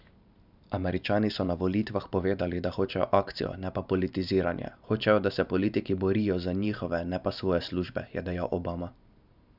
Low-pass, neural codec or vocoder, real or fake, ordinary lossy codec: 5.4 kHz; vocoder, 44.1 kHz, 128 mel bands every 512 samples, BigVGAN v2; fake; none